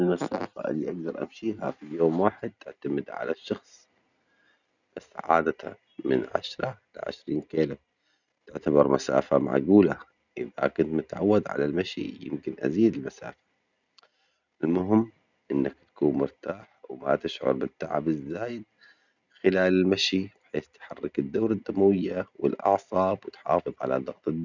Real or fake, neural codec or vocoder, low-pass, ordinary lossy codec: real; none; 7.2 kHz; none